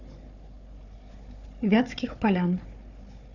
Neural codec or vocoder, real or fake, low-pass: codec, 16 kHz, 4 kbps, FunCodec, trained on Chinese and English, 50 frames a second; fake; 7.2 kHz